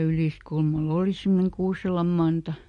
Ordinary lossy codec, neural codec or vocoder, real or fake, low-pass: MP3, 48 kbps; none; real; 14.4 kHz